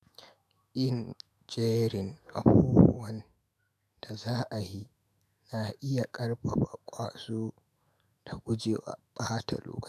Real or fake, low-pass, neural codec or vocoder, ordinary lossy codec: fake; 14.4 kHz; autoencoder, 48 kHz, 128 numbers a frame, DAC-VAE, trained on Japanese speech; none